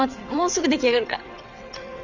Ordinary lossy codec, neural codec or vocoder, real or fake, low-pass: none; vocoder, 22.05 kHz, 80 mel bands, WaveNeXt; fake; 7.2 kHz